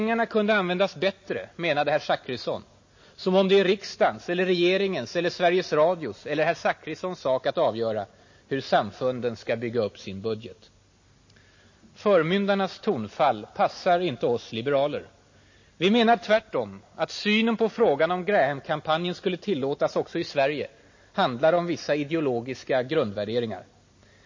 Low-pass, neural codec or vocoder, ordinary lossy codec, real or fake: 7.2 kHz; none; MP3, 32 kbps; real